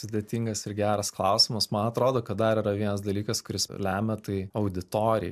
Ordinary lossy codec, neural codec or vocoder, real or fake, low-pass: MP3, 96 kbps; none; real; 14.4 kHz